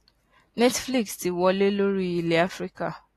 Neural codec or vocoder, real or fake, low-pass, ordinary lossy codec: none; real; 14.4 kHz; AAC, 48 kbps